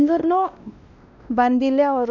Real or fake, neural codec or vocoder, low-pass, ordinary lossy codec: fake; codec, 16 kHz, 1 kbps, X-Codec, WavLM features, trained on Multilingual LibriSpeech; 7.2 kHz; none